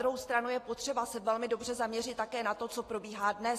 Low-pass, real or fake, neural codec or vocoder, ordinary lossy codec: 14.4 kHz; real; none; AAC, 48 kbps